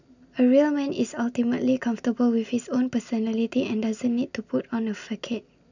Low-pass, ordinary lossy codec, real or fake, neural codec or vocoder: 7.2 kHz; none; real; none